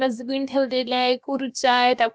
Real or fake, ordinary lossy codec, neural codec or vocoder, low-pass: fake; none; codec, 16 kHz, about 1 kbps, DyCAST, with the encoder's durations; none